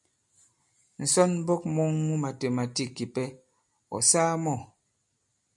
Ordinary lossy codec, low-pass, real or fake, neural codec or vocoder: MP3, 64 kbps; 10.8 kHz; real; none